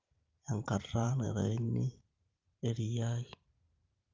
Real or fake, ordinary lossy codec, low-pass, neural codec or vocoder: fake; Opus, 24 kbps; 7.2 kHz; vocoder, 44.1 kHz, 128 mel bands every 512 samples, BigVGAN v2